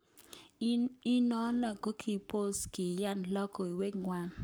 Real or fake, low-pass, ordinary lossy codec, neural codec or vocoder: fake; none; none; codec, 44.1 kHz, 7.8 kbps, Pupu-Codec